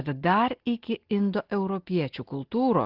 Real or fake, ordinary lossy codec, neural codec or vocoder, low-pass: real; Opus, 16 kbps; none; 5.4 kHz